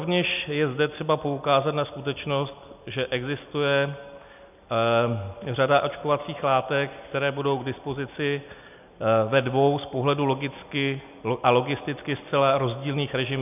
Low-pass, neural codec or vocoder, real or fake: 3.6 kHz; none; real